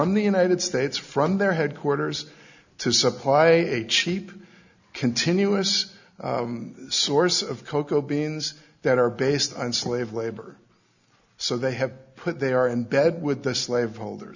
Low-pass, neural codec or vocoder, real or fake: 7.2 kHz; none; real